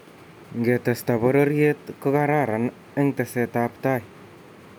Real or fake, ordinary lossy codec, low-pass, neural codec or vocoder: real; none; none; none